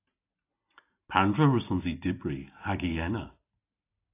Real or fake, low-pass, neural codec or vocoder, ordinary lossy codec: real; 3.6 kHz; none; AAC, 24 kbps